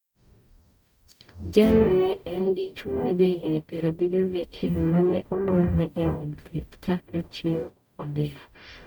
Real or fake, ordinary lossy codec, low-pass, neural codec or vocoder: fake; none; 19.8 kHz; codec, 44.1 kHz, 0.9 kbps, DAC